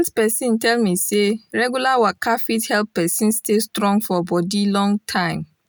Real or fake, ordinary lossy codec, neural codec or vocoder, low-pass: real; none; none; none